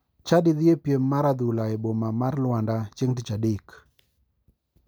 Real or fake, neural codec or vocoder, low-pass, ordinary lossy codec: real; none; none; none